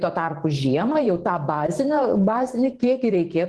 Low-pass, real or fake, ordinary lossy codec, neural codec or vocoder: 10.8 kHz; fake; Opus, 16 kbps; vocoder, 44.1 kHz, 128 mel bands every 512 samples, BigVGAN v2